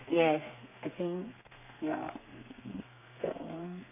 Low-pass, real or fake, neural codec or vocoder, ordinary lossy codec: 3.6 kHz; fake; codec, 32 kHz, 1.9 kbps, SNAC; none